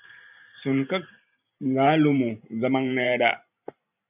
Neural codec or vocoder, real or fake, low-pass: vocoder, 44.1 kHz, 128 mel bands every 512 samples, BigVGAN v2; fake; 3.6 kHz